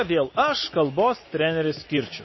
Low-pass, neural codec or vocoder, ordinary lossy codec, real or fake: 7.2 kHz; none; MP3, 24 kbps; real